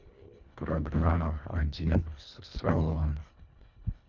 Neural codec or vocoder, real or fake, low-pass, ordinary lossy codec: codec, 24 kHz, 1.5 kbps, HILCodec; fake; 7.2 kHz; none